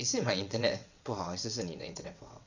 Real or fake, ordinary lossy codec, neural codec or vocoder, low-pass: fake; none; vocoder, 22.05 kHz, 80 mel bands, WaveNeXt; 7.2 kHz